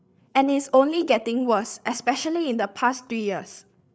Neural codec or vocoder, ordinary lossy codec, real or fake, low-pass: codec, 16 kHz, 8 kbps, FreqCodec, larger model; none; fake; none